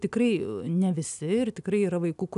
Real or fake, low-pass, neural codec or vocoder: real; 10.8 kHz; none